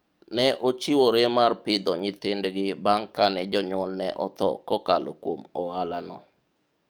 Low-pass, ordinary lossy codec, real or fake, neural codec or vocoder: none; none; fake; codec, 44.1 kHz, 7.8 kbps, DAC